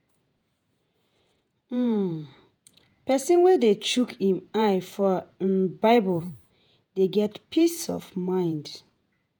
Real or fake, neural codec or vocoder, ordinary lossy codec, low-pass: fake; vocoder, 48 kHz, 128 mel bands, Vocos; none; none